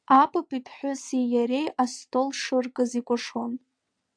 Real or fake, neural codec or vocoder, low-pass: fake; vocoder, 22.05 kHz, 80 mel bands, WaveNeXt; 9.9 kHz